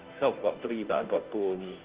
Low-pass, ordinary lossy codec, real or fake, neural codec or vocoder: 3.6 kHz; Opus, 16 kbps; fake; codec, 16 kHz, 0.5 kbps, FunCodec, trained on Chinese and English, 25 frames a second